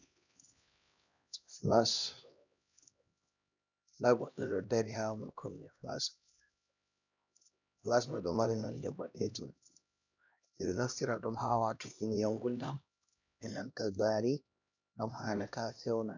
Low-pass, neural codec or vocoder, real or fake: 7.2 kHz; codec, 16 kHz, 1 kbps, X-Codec, HuBERT features, trained on LibriSpeech; fake